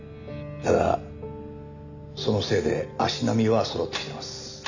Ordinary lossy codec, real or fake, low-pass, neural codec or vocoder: none; real; 7.2 kHz; none